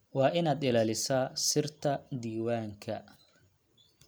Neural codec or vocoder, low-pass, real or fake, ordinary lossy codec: none; none; real; none